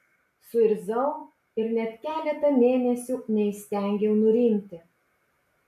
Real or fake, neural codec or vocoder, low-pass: real; none; 14.4 kHz